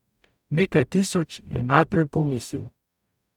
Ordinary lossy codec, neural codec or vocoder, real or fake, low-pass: none; codec, 44.1 kHz, 0.9 kbps, DAC; fake; 19.8 kHz